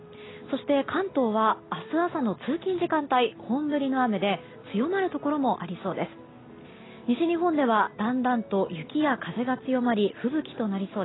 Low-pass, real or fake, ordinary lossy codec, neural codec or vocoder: 7.2 kHz; real; AAC, 16 kbps; none